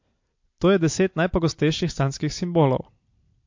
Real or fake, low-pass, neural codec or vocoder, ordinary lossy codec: real; 7.2 kHz; none; MP3, 48 kbps